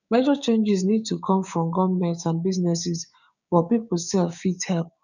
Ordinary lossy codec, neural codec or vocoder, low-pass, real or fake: none; codec, 16 kHz, 6 kbps, DAC; 7.2 kHz; fake